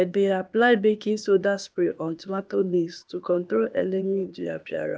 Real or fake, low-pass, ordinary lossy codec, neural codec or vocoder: fake; none; none; codec, 16 kHz, 0.8 kbps, ZipCodec